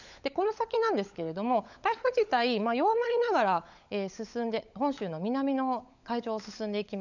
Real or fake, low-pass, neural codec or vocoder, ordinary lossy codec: fake; 7.2 kHz; codec, 16 kHz, 16 kbps, FunCodec, trained on LibriTTS, 50 frames a second; none